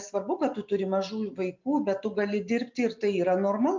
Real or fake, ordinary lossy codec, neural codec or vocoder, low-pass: real; MP3, 64 kbps; none; 7.2 kHz